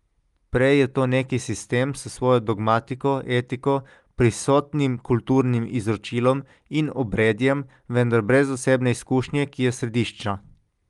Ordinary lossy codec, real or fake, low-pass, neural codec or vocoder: Opus, 32 kbps; real; 10.8 kHz; none